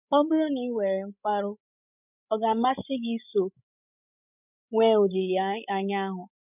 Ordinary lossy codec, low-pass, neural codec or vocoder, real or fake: none; 3.6 kHz; codec, 16 kHz, 16 kbps, FreqCodec, larger model; fake